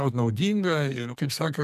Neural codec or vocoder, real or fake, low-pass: codec, 32 kHz, 1.9 kbps, SNAC; fake; 14.4 kHz